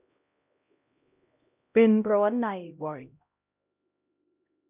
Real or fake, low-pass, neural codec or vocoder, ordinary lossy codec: fake; 3.6 kHz; codec, 16 kHz, 0.5 kbps, X-Codec, HuBERT features, trained on LibriSpeech; none